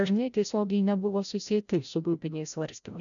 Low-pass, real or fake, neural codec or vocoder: 7.2 kHz; fake; codec, 16 kHz, 0.5 kbps, FreqCodec, larger model